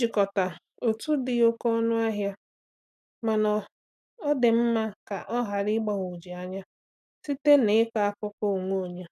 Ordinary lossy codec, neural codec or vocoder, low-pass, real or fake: none; none; 14.4 kHz; real